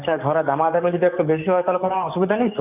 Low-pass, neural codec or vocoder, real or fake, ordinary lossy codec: 3.6 kHz; codec, 44.1 kHz, 7.8 kbps, DAC; fake; none